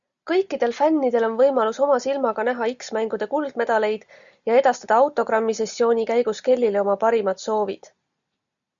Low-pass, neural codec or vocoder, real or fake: 7.2 kHz; none; real